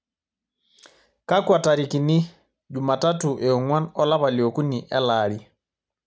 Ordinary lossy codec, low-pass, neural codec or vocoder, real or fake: none; none; none; real